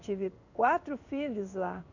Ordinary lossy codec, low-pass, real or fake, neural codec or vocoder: none; 7.2 kHz; fake; codec, 16 kHz in and 24 kHz out, 1 kbps, XY-Tokenizer